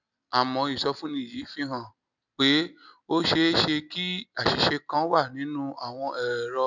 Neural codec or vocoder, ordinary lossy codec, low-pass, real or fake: none; none; 7.2 kHz; real